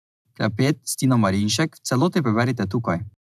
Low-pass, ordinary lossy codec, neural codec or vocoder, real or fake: 14.4 kHz; none; none; real